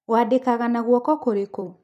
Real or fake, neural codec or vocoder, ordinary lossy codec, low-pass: fake; vocoder, 44.1 kHz, 128 mel bands every 256 samples, BigVGAN v2; none; 14.4 kHz